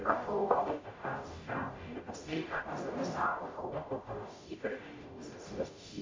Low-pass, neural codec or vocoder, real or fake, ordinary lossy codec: 7.2 kHz; codec, 44.1 kHz, 0.9 kbps, DAC; fake; MP3, 64 kbps